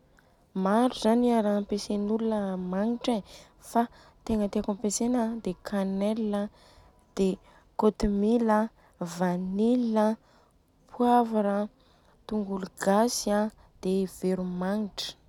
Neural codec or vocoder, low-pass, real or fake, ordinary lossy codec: none; 19.8 kHz; real; none